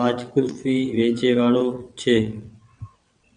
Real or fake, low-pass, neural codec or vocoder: fake; 9.9 kHz; vocoder, 22.05 kHz, 80 mel bands, WaveNeXt